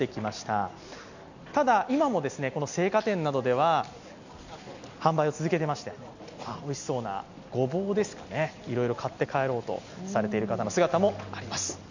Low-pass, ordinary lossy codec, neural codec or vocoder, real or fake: 7.2 kHz; none; none; real